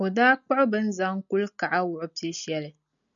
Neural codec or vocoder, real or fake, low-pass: none; real; 7.2 kHz